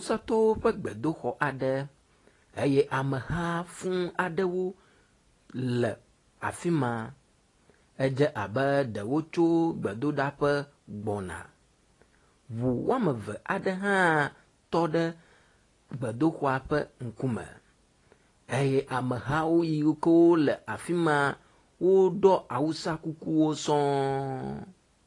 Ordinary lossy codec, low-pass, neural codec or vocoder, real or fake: AAC, 32 kbps; 10.8 kHz; none; real